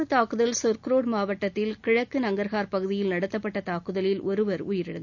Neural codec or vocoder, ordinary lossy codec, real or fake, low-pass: none; none; real; 7.2 kHz